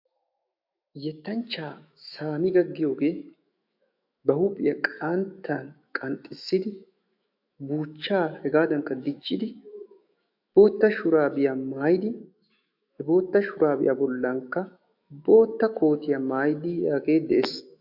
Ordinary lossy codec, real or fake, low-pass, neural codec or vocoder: AAC, 48 kbps; fake; 5.4 kHz; autoencoder, 48 kHz, 128 numbers a frame, DAC-VAE, trained on Japanese speech